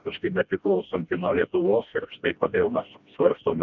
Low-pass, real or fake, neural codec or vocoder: 7.2 kHz; fake; codec, 16 kHz, 1 kbps, FreqCodec, smaller model